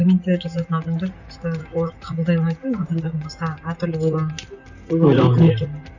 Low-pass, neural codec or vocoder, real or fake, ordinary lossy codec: 7.2 kHz; vocoder, 44.1 kHz, 128 mel bands, Pupu-Vocoder; fake; none